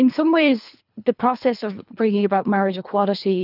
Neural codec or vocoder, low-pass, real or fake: codec, 24 kHz, 3 kbps, HILCodec; 5.4 kHz; fake